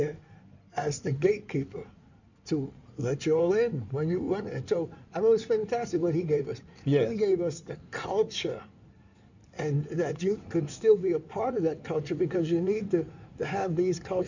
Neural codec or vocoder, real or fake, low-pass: codec, 16 kHz in and 24 kHz out, 2.2 kbps, FireRedTTS-2 codec; fake; 7.2 kHz